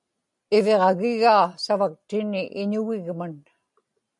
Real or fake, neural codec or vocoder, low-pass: real; none; 10.8 kHz